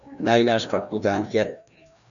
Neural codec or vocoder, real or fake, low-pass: codec, 16 kHz, 1 kbps, FreqCodec, larger model; fake; 7.2 kHz